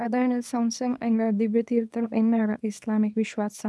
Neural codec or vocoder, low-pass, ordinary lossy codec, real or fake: codec, 24 kHz, 0.9 kbps, WavTokenizer, small release; none; none; fake